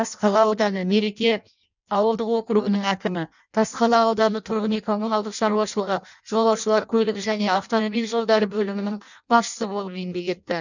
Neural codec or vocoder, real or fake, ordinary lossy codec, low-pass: codec, 16 kHz in and 24 kHz out, 0.6 kbps, FireRedTTS-2 codec; fake; none; 7.2 kHz